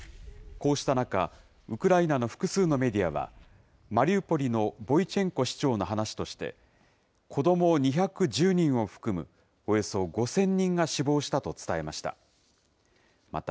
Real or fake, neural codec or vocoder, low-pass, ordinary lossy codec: real; none; none; none